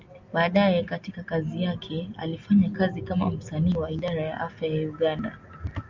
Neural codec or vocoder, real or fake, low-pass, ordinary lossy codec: none; real; 7.2 kHz; MP3, 64 kbps